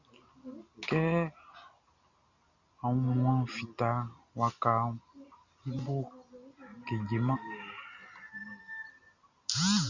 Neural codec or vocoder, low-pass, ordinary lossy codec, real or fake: none; 7.2 kHz; Opus, 64 kbps; real